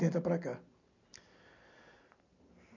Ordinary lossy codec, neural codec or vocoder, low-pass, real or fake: none; none; 7.2 kHz; real